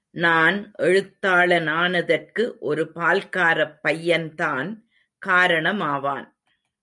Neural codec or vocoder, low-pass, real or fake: none; 10.8 kHz; real